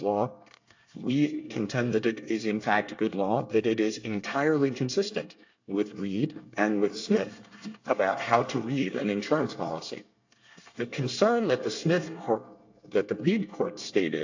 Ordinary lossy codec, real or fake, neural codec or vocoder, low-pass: AAC, 48 kbps; fake; codec, 24 kHz, 1 kbps, SNAC; 7.2 kHz